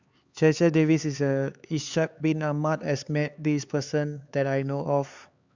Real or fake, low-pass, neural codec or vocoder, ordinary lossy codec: fake; 7.2 kHz; codec, 16 kHz, 4 kbps, X-Codec, HuBERT features, trained on LibriSpeech; Opus, 64 kbps